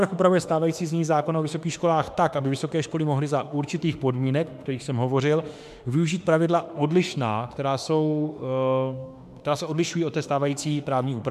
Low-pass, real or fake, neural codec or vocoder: 14.4 kHz; fake; autoencoder, 48 kHz, 32 numbers a frame, DAC-VAE, trained on Japanese speech